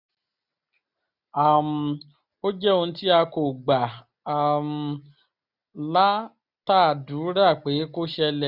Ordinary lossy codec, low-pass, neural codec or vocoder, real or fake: none; 5.4 kHz; none; real